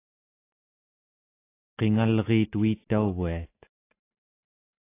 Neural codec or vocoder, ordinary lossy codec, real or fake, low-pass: none; AAC, 24 kbps; real; 3.6 kHz